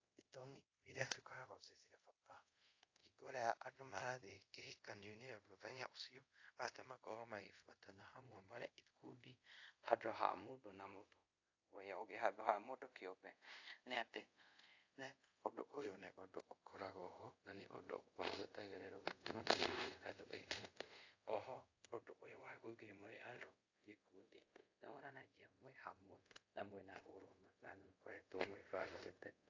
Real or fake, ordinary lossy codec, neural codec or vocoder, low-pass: fake; AAC, 48 kbps; codec, 24 kHz, 0.5 kbps, DualCodec; 7.2 kHz